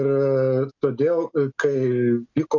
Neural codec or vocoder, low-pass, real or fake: none; 7.2 kHz; real